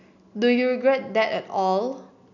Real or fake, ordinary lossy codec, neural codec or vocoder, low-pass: real; none; none; 7.2 kHz